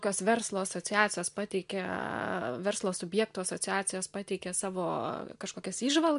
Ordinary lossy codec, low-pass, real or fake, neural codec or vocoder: MP3, 64 kbps; 10.8 kHz; real; none